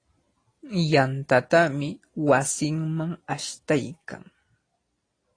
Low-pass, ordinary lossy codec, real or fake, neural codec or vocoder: 9.9 kHz; AAC, 32 kbps; real; none